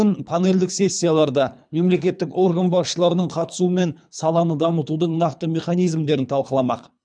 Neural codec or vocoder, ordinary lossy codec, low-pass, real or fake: codec, 24 kHz, 3 kbps, HILCodec; none; 9.9 kHz; fake